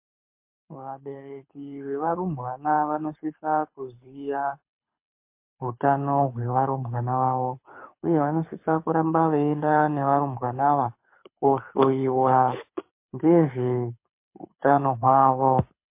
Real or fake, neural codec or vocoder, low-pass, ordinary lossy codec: fake; codec, 24 kHz, 6 kbps, HILCodec; 3.6 kHz; MP3, 24 kbps